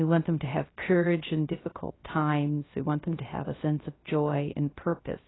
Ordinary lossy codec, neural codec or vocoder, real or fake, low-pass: AAC, 16 kbps; codec, 16 kHz, 0.3 kbps, FocalCodec; fake; 7.2 kHz